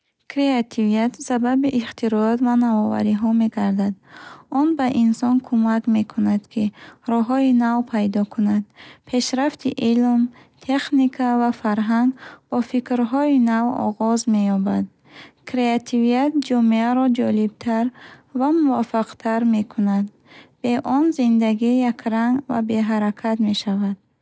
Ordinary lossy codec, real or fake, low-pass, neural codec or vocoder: none; real; none; none